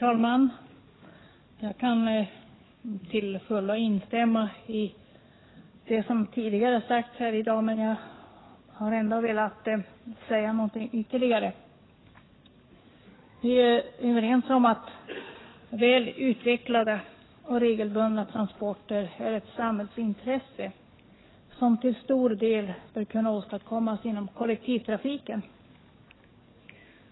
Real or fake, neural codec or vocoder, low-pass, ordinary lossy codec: fake; codec, 44.1 kHz, 7.8 kbps, DAC; 7.2 kHz; AAC, 16 kbps